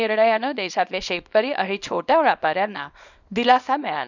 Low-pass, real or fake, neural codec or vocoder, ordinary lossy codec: 7.2 kHz; fake; codec, 24 kHz, 0.9 kbps, WavTokenizer, small release; none